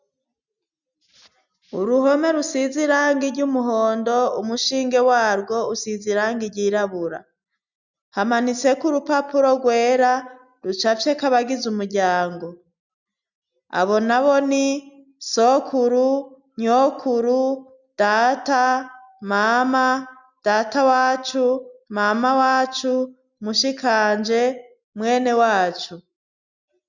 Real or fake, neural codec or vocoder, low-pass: real; none; 7.2 kHz